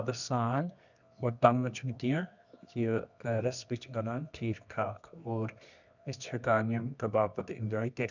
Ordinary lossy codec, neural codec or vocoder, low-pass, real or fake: none; codec, 24 kHz, 0.9 kbps, WavTokenizer, medium music audio release; 7.2 kHz; fake